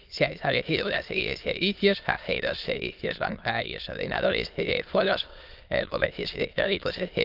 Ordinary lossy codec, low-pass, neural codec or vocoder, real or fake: Opus, 24 kbps; 5.4 kHz; autoencoder, 22.05 kHz, a latent of 192 numbers a frame, VITS, trained on many speakers; fake